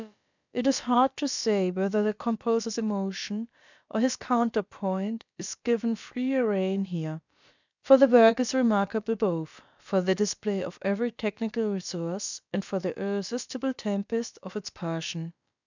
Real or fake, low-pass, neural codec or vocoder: fake; 7.2 kHz; codec, 16 kHz, about 1 kbps, DyCAST, with the encoder's durations